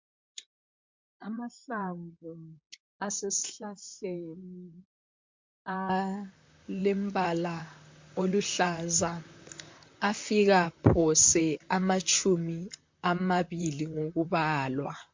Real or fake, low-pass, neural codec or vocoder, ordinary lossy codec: fake; 7.2 kHz; vocoder, 44.1 kHz, 128 mel bands, Pupu-Vocoder; MP3, 48 kbps